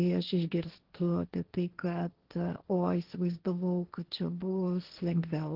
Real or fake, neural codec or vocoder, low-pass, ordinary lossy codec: fake; codec, 16 kHz, 1.1 kbps, Voila-Tokenizer; 5.4 kHz; Opus, 16 kbps